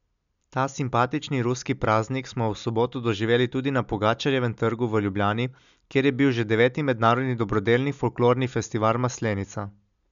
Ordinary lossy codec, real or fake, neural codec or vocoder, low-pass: none; real; none; 7.2 kHz